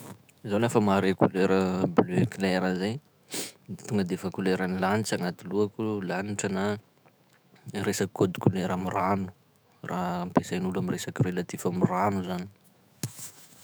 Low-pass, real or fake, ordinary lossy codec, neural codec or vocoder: none; fake; none; autoencoder, 48 kHz, 128 numbers a frame, DAC-VAE, trained on Japanese speech